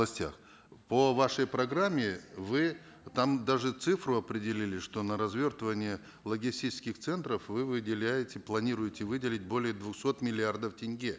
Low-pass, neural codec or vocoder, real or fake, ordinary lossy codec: none; none; real; none